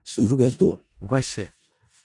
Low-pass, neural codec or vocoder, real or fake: 10.8 kHz; codec, 16 kHz in and 24 kHz out, 0.4 kbps, LongCat-Audio-Codec, four codebook decoder; fake